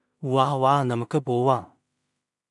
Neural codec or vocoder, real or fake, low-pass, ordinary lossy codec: codec, 16 kHz in and 24 kHz out, 0.4 kbps, LongCat-Audio-Codec, two codebook decoder; fake; 10.8 kHz; MP3, 96 kbps